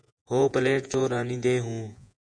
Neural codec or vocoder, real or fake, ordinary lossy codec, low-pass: vocoder, 48 kHz, 128 mel bands, Vocos; fake; MP3, 96 kbps; 9.9 kHz